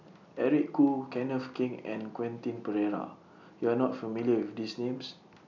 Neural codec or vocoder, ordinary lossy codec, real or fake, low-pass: none; none; real; 7.2 kHz